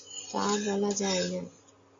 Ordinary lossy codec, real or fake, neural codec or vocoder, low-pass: AAC, 48 kbps; real; none; 7.2 kHz